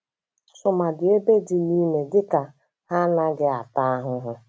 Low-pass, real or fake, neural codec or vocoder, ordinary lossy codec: none; real; none; none